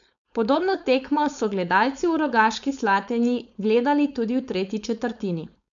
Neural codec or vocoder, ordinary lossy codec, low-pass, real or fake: codec, 16 kHz, 4.8 kbps, FACodec; none; 7.2 kHz; fake